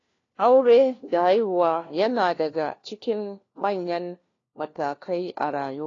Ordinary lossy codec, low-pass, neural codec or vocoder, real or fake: AAC, 32 kbps; 7.2 kHz; codec, 16 kHz, 1 kbps, FunCodec, trained on LibriTTS, 50 frames a second; fake